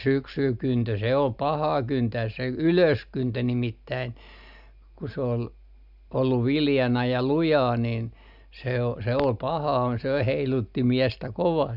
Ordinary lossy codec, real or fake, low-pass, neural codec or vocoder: none; real; 5.4 kHz; none